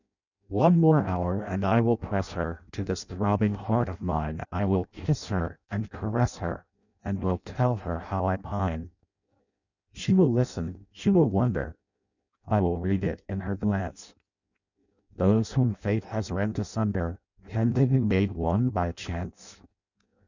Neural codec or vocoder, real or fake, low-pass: codec, 16 kHz in and 24 kHz out, 0.6 kbps, FireRedTTS-2 codec; fake; 7.2 kHz